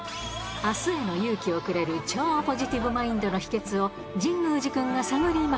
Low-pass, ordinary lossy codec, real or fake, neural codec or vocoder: none; none; real; none